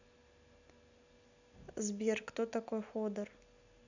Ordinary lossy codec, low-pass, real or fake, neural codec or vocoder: none; 7.2 kHz; real; none